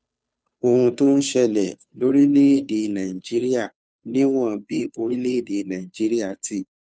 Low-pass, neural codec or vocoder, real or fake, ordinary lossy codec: none; codec, 16 kHz, 2 kbps, FunCodec, trained on Chinese and English, 25 frames a second; fake; none